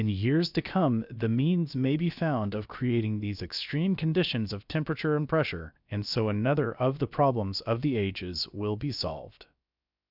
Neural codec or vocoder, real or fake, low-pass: codec, 16 kHz, about 1 kbps, DyCAST, with the encoder's durations; fake; 5.4 kHz